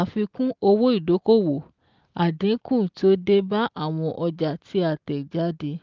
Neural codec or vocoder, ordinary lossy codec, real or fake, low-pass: none; Opus, 32 kbps; real; 7.2 kHz